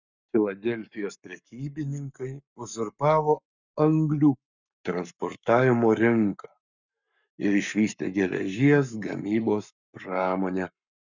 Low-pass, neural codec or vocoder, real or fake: 7.2 kHz; codec, 44.1 kHz, 7.8 kbps, Pupu-Codec; fake